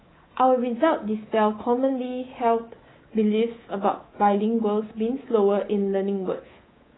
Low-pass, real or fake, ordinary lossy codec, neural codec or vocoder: 7.2 kHz; fake; AAC, 16 kbps; codec, 24 kHz, 3.1 kbps, DualCodec